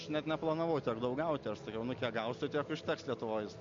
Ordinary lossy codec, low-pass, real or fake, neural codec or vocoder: MP3, 48 kbps; 7.2 kHz; real; none